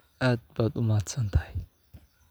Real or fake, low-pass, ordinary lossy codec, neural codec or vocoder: real; none; none; none